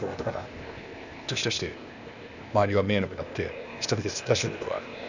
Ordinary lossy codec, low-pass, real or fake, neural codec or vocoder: none; 7.2 kHz; fake; codec, 16 kHz, 0.8 kbps, ZipCodec